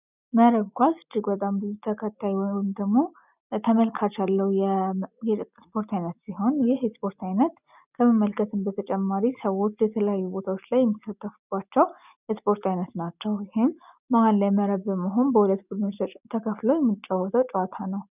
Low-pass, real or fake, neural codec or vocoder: 3.6 kHz; real; none